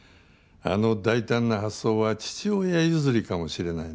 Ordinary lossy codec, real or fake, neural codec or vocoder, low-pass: none; real; none; none